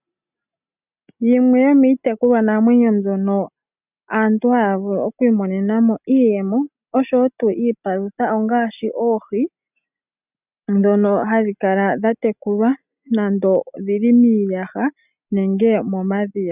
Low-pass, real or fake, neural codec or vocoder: 3.6 kHz; real; none